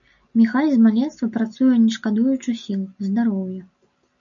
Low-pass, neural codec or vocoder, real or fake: 7.2 kHz; none; real